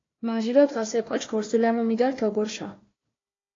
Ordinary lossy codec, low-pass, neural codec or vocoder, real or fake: AAC, 32 kbps; 7.2 kHz; codec, 16 kHz, 1 kbps, FunCodec, trained on Chinese and English, 50 frames a second; fake